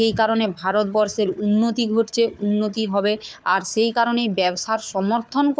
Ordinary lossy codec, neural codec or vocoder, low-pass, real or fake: none; codec, 16 kHz, 4 kbps, FunCodec, trained on Chinese and English, 50 frames a second; none; fake